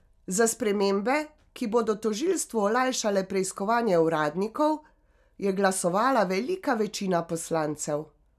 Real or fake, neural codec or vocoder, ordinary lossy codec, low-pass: real; none; none; 14.4 kHz